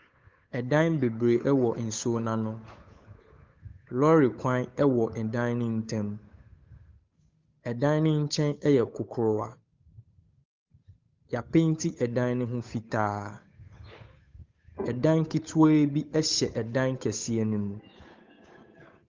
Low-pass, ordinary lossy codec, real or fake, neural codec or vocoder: 7.2 kHz; Opus, 24 kbps; fake; codec, 16 kHz, 8 kbps, FunCodec, trained on Chinese and English, 25 frames a second